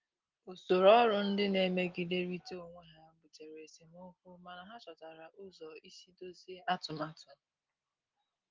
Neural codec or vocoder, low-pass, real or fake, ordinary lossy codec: none; 7.2 kHz; real; Opus, 24 kbps